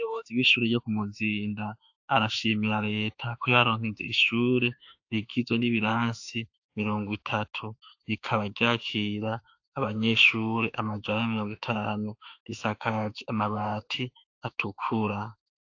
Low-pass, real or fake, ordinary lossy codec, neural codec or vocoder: 7.2 kHz; fake; AAC, 48 kbps; autoencoder, 48 kHz, 32 numbers a frame, DAC-VAE, trained on Japanese speech